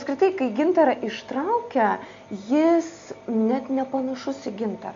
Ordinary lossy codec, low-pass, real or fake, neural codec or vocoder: MP3, 48 kbps; 7.2 kHz; real; none